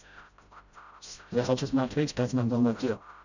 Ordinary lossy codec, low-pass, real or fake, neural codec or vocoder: none; 7.2 kHz; fake; codec, 16 kHz, 0.5 kbps, FreqCodec, smaller model